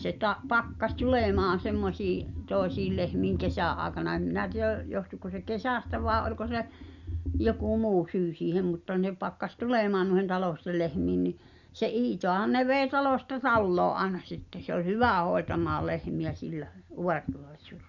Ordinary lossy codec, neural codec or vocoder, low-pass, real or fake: Opus, 64 kbps; none; 7.2 kHz; real